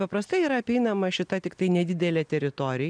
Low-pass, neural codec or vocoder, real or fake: 9.9 kHz; none; real